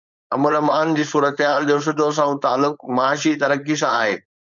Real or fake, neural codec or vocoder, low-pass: fake; codec, 16 kHz, 4.8 kbps, FACodec; 7.2 kHz